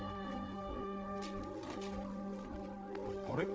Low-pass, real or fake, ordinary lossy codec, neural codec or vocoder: none; fake; none; codec, 16 kHz, 8 kbps, FreqCodec, larger model